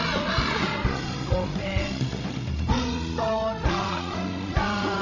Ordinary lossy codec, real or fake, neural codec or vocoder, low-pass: none; fake; codec, 16 kHz, 16 kbps, FreqCodec, larger model; 7.2 kHz